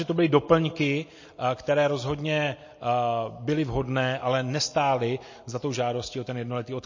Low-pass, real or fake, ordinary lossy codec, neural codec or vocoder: 7.2 kHz; real; MP3, 32 kbps; none